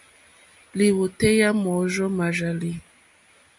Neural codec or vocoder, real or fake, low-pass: none; real; 10.8 kHz